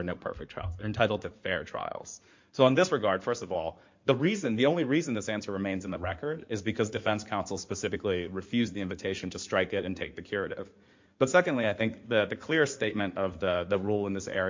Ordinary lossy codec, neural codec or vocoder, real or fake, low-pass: MP3, 48 kbps; codec, 16 kHz in and 24 kHz out, 2.2 kbps, FireRedTTS-2 codec; fake; 7.2 kHz